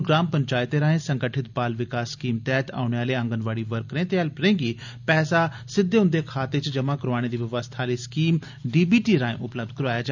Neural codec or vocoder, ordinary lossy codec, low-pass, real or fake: none; none; 7.2 kHz; real